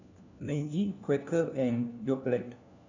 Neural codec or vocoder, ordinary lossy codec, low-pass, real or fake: codec, 16 kHz, 1 kbps, FunCodec, trained on LibriTTS, 50 frames a second; none; 7.2 kHz; fake